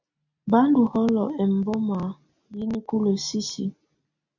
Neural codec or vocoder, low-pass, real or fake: none; 7.2 kHz; real